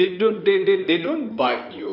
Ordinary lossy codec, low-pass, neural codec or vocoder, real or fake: none; 5.4 kHz; codec, 16 kHz in and 24 kHz out, 2.2 kbps, FireRedTTS-2 codec; fake